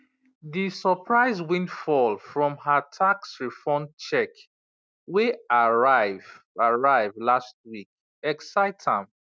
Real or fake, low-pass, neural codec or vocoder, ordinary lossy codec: real; none; none; none